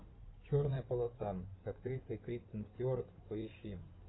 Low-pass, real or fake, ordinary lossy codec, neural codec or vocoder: 7.2 kHz; fake; AAC, 16 kbps; codec, 16 kHz in and 24 kHz out, 2.2 kbps, FireRedTTS-2 codec